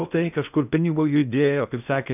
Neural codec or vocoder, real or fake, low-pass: codec, 16 kHz in and 24 kHz out, 0.6 kbps, FocalCodec, streaming, 2048 codes; fake; 3.6 kHz